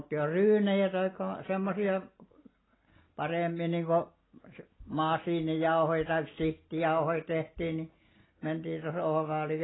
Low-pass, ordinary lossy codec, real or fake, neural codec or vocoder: 7.2 kHz; AAC, 16 kbps; real; none